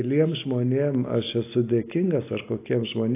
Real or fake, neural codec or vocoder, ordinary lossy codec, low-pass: real; none; AAC, 24 kbps; 3.6 kHz